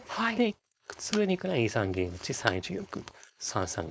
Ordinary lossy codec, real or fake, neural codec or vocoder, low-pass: none; fake; codec, 16 kHz, 4.8 kbps, FACodec; none